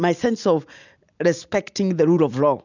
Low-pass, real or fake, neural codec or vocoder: 7.2 kHz; real; none